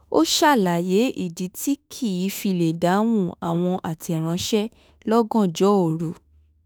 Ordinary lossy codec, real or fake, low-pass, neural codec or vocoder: none; fake; none; autoencoder, 48 kHz, 32 numbers a frame, DAC-VAE, trained on Japanese speech